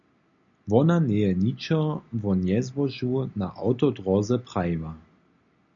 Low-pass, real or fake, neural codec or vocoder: 7.2 kHz; real; none